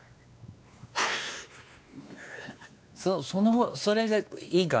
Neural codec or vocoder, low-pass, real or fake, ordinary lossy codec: codec, 16 kHz, 2 kbps, X-Codec, WavLM features, trained on Multilingual LibriSpeech; none; fake; none